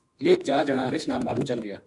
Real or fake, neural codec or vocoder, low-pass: fake; autoencoder, 48 kHz, 32 numbers a frame, DAC-VAE, trained on Japanese speech; 10.8 kHz